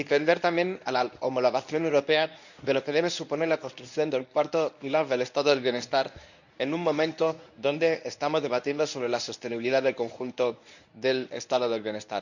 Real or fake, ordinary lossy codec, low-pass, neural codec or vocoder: fake; none; 7.2 kHz; codec, 24 kHz, 0.9 kbps, WavTokenizer, medium speech release version 1